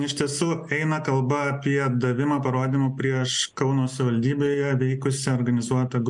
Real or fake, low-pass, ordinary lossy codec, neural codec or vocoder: real; 10.8 kHz; MP3, 96 kbps; none